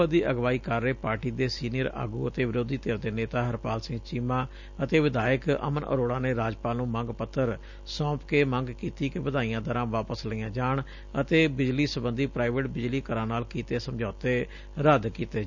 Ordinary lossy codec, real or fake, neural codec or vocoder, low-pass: none; real; none; 7.2 kHz